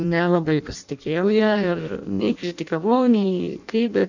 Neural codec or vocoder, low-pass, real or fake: codec, 16 kHz in and 24 kHz out, 0.6 kbps, FireRedTTS-2 codec; 7.2 kHz; fake